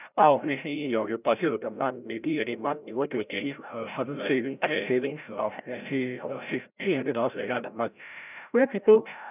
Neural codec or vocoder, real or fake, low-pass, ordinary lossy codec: codec, 16 kHz, 0.5 kbps, FreqCodec, larger model; fake; 3.6 kHz; none